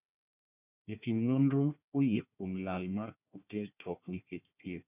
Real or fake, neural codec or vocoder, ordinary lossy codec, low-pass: fake; codec, 16 kHz, 1 kbps, FunCodec, trained on Chinese and English, 50 frames a second; none; 3.6 kHz